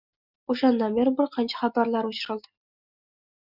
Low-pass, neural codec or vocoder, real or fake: 5.4 kHz; none; real